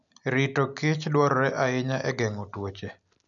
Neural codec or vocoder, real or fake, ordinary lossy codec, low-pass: none; real; none; 7.2 kHz